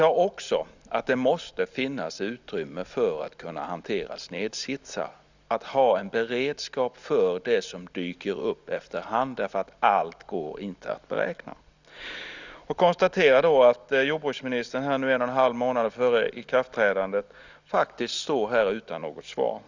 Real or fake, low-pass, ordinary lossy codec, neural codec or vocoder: real; 7.2 kHz; Opus, 64 kbps; none